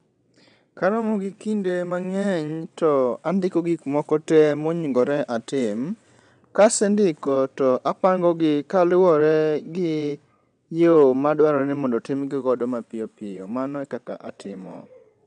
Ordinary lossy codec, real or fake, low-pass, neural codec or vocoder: none; fake; 9.9 kHz; vocoder, 22.05 kHz, 80 mel bands, WaveNeXt